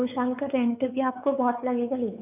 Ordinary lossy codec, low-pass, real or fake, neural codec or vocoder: none; 3.6 kHz; fake; codec, 16 kHz, 4 kbps, X-Codec, WavLM features, trained on Multilingual LibriSpeech